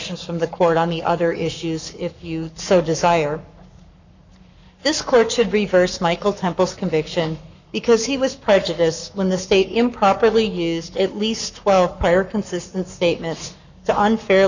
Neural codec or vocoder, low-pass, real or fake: codec, 44.1 kHz, 7.8 kbps, Pupu-Codec; 7.2 kHz; fake